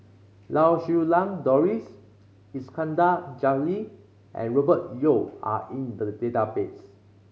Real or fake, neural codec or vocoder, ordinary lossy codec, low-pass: real; none; none; none